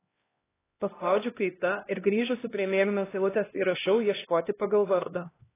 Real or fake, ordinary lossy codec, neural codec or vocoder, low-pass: fake; AAC, 16 kbps; codec, 16 kHz, 1 kbps, X-Codec, HuBERT features, trained on LibriSpeech; 3.6 kHz